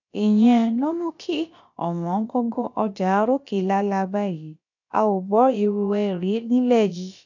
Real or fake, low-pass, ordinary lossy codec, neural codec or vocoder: fake; 7.2 kHz; none; codec, 16 kHz, about 1 kbps, DyCAST, with the encoder's durations